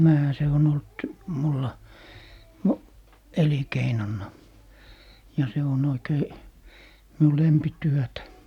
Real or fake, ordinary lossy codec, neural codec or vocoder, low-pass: real; none; none; 19.8 kHz